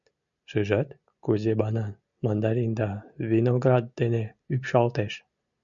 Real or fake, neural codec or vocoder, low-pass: real; none; 7.2 kHz